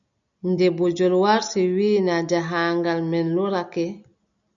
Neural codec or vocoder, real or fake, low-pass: none; real; 7.2 kHz